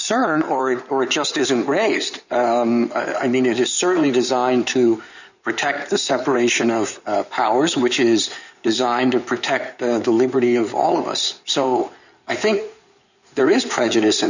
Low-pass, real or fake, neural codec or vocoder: 7.2 kHz; fake; codec, 16 kHz in and 24 kHz out, 2.2 kbps, FireRedTTS-2 codec